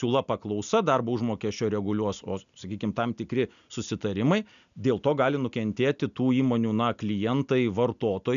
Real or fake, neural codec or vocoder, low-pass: real; none; 7.2 kHz